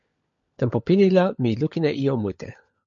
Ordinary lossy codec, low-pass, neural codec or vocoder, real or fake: MP3, 48 kbps; 7.2 kHz; codec, 16 kHz, 16 kbps, FunCodec, trained on LibriTTS, 50 frames a second; fake